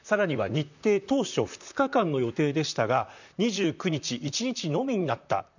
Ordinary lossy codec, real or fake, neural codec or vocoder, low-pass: none; fake; vocoder, 44.1 kHz, 128 mel bands, Pupu-Vocoder; 7.2 kHz